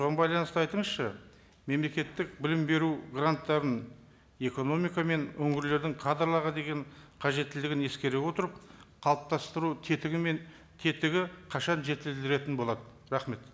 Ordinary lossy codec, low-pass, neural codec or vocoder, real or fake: none; none; none; real